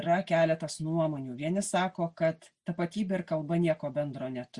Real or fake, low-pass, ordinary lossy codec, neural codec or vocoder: real; 10.8 kHz; Opus, 64 kbps; none